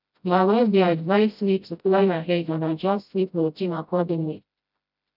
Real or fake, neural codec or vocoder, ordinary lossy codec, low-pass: fake; codec, 16 kHz, 0.5 kbps, FreqCodec, smaller model; none; 5.4 kHz